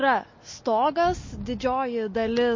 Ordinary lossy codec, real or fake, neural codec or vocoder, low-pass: MP3, 32 kbps; real; none; 7.2 kHz